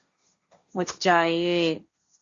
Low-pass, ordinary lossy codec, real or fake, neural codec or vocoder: 7.2 kHz; Opus, 64 kbps; fake; codec, 16 kHz, 1.1 kbps, Voila-Tokenizer